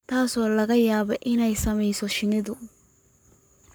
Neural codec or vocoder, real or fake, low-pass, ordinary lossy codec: codec, 44.1 kHz, 7.8 kbps, Pupu-Codec; fake; none; none